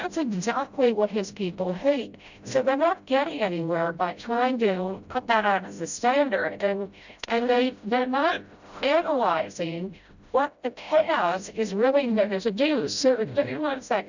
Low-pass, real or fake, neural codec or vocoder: 7.2 kHz; fake; codec, 16 kHz, 0.5 kbps, FreqCodec, smaller model